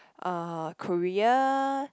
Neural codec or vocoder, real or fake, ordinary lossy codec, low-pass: none; real; none; none